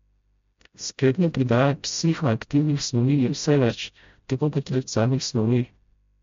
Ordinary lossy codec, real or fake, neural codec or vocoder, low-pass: MP3, 48 kbps; fake; codec, 16 kHz, 0.5 kbps, FreqCodec, smaller model; 7.2 kHz